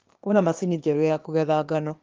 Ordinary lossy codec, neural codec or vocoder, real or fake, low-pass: Opus, 32 kbps; codec, 16 kHz, 0.8 kbps, ZipCodec; fake; 7.2 kHz